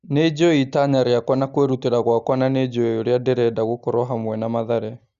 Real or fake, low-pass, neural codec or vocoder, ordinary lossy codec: real; 7.2 kHz; none; Opus, 64 kbps